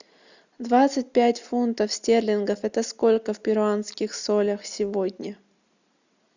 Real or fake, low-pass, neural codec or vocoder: real; 7.2 kHz; none